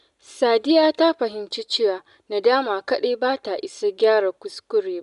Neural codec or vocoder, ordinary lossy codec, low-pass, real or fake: none; none; 10.8 kHz; real